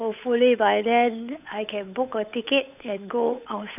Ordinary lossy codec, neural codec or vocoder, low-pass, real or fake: none; codec, 16 kHz, 8 kbps, FunCodec, trained on Chinese and English, 25 frames a second; 3.6 kHz; fake